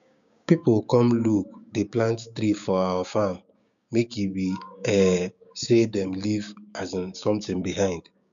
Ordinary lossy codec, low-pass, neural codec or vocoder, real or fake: none; 7.2 kHz; codec, 16 kHz, 6 kbps, DAC; fake